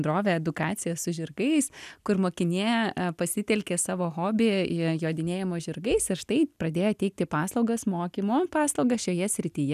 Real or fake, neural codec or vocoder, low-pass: real; none; 14.4 kHz